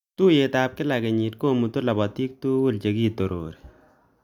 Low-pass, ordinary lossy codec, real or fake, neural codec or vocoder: 19.8 kHz; none; real; none